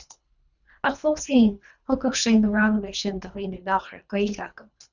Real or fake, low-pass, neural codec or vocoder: fake; 7.2 kHz; codec, 24 kHz, 3 kbps, HILCodec